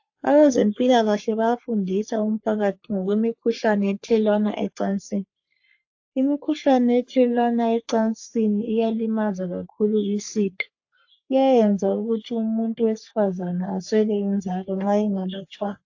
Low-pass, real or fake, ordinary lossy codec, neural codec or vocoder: 7.2 kHz; fake; AAC, 48 kbps; codec, 44.1 kHz, 3.4 kbps, Pupu-Codec